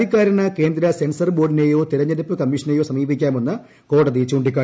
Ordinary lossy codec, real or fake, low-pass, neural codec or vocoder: none; real; none; none